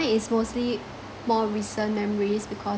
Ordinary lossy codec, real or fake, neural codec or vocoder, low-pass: none; real; none; none